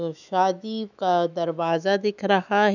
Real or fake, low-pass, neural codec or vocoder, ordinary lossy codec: fake; 7.2 kHz; autoencoder, 48 kHz, 128 numbers a frame, DAC-VAE, trained on Japanese speech; none